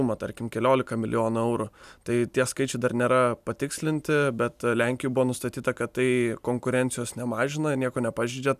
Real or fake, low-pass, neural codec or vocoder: real; 14.4 kHz; none